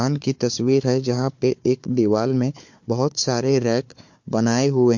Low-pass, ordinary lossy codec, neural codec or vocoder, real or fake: 7.2 kHz; MP3, 48 kbps; codec, 16 kHz, 4 kbps, FunCodec, trained on Chinese and English, 50 frames a second; fake